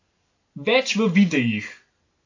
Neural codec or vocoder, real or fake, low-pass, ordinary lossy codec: none; real; 7.2 kHz; AAC, 48 kbps